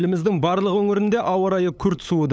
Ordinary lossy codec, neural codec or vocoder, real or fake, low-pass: none; codec, 16 kHz, 8 kbps, FunCodec, trained on LibriTTS, 25 frames a second; fake; none